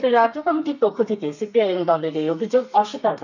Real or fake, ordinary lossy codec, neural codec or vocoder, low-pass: fake; none; codec, 32 kHz, 1.9 kbps, SNAC; 7.2 kHz